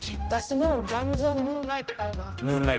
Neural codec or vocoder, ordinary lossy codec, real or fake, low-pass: codec, 16 kHz, 0.5 kbps, X-Codec, HuBERT features, trained on general audio; none; fake; none